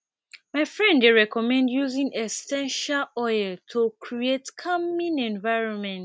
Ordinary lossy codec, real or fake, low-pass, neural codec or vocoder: none; real; none; none